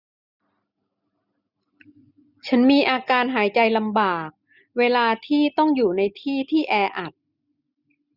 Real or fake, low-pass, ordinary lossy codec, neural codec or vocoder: real; 5.4 kHz; none; none